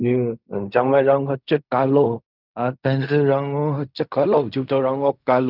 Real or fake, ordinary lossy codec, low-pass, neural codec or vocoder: fake; none; 5.4 kHz; codec, 16 kHz in and 24 kHz out, 0.4 kbps, LongCat-Audio-Codec, fine tuned four codebook decoder